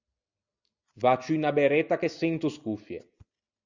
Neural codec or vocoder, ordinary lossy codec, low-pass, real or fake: none; Opus, 64 kbps; 7.2 kHz; real